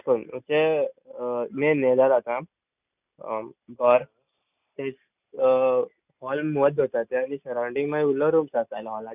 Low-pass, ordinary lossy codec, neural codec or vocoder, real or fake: 3.6 kHz; none; none; real